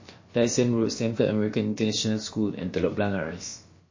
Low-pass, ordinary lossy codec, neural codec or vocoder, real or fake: 7.2 kHz; MP3, 32 kbps; codec, 16 kHz, about 1 kbps, DyCAST, with the encoder's durations; fake